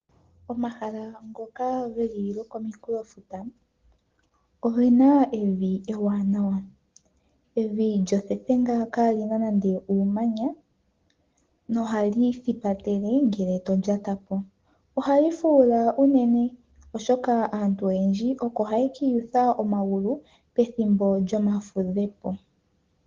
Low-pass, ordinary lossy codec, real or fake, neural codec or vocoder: 7.2 kHz; Opus, 16 kbps; real; none